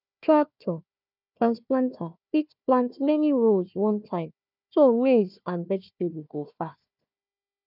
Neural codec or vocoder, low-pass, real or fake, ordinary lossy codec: codec, 16 kHz, 1 kbps, FunCodec, trained on Chinese and English, 50 frames a second; 5.4 kHz; fake; none